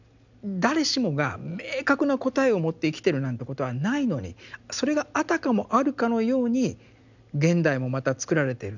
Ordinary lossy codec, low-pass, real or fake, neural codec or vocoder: none; 7.2 kHz; real; none